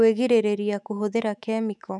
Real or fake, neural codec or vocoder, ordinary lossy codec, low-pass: fake; autoencoder, 48 kHz, 128 numbers a frame, DAC-VAE, trained on Japanese speech; none; 10.8 kHz